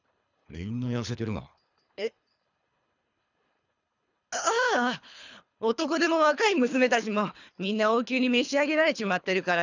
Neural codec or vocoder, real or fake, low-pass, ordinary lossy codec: codec, 24 kHz, 3 kbps, HILCodec; fake; 7.2 kHz; none